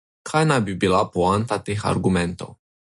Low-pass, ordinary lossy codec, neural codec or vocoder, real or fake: 14.4 kHz; MP3, 48 kbps; none; real